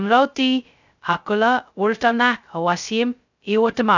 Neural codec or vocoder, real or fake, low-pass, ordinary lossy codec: codec, 16 kHz, 0.2 kbps, FocalCodec; fake; 7.2 kHz; none